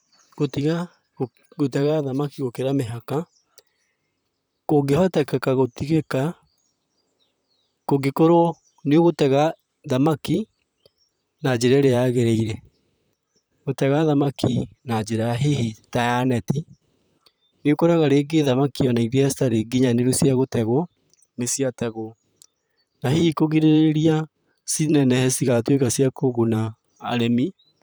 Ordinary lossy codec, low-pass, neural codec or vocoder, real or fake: none; none; vocoder, 44.1 kHz, 128 mel bands, Pupu-Vocoder; fake